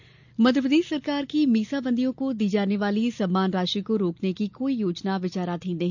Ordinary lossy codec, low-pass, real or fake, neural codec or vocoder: none; 7.2 kHz; real; none